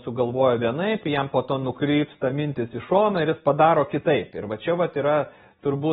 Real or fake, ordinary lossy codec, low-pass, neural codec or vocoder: real; AAC, 16 kbps; 19.8 kHz; none